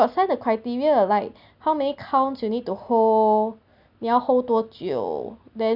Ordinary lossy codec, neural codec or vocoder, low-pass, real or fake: none; none; 5.4 kHz; real